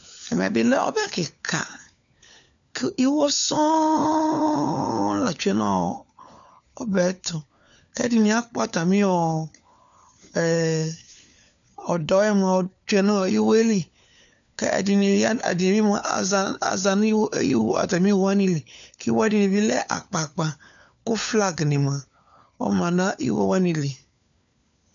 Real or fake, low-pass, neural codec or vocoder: fake; 7.2 kHz; codec, 16 kHz, 4 kbps, FunCodec, trained on LibriTTS, 50 frames a second